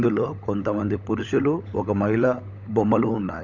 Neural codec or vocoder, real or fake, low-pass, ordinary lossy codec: codec, 16 kHz, 16 kbps, FunCodec, trained on LibriTTS, 50 frames a second; fake; 7.2 kHz; none